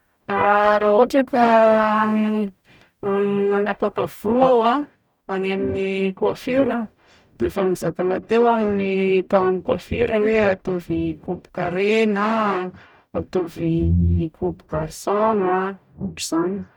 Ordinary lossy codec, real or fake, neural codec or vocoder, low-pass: none; fake; codec, 44.1 kHz, 0.9 kbps, DAC; 19.8 kHz